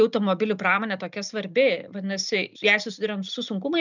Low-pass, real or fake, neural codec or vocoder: 7.2 kHz; real; none